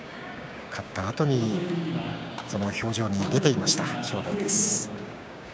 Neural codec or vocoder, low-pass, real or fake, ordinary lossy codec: codec, 16 kHz, 6 kbps, DAC; none; fake; none